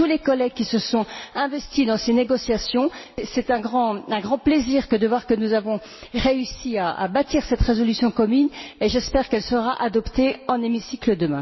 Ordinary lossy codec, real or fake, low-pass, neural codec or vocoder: MP3, 24 kbps; real; 7.2 kHz; none